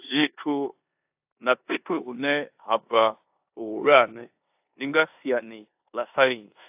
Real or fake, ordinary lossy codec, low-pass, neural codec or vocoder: fake; AAC, 32 kbps; 3.6 kHz; codec, 16 kHz in and 24 kHz out, 0.9 kbps, LongCat-Audio-Codec, four codebook decoder